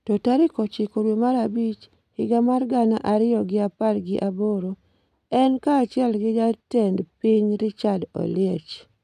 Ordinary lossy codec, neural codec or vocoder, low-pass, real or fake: none; none; 14.4 kHz; real